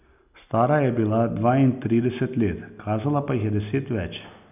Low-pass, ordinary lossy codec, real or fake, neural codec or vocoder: 3.6 kHz; none; real; none